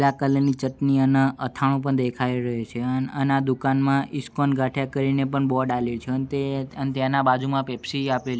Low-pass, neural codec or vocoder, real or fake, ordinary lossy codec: none; none; real; none